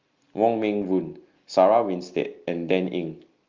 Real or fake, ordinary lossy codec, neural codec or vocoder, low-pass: real; Opus, 32 kbps; none; 7.2 kHz